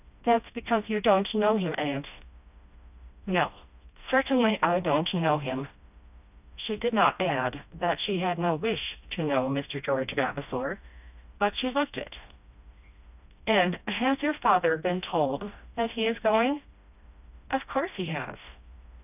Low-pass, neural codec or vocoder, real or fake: 3.6 kHz; codec, 16 kHz, 1 kbps, FreqCodec, smaller model; fake